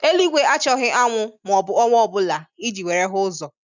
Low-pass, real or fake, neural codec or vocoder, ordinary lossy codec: 7.2 kHz; real; none; none